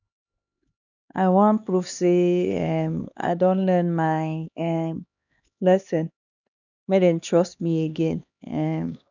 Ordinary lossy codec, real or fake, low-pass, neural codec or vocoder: none; fake; 7.2 kHz; codec, 16 kHz, 2 kbps, X-Codec, HuBERT features, trained on LibriSpeech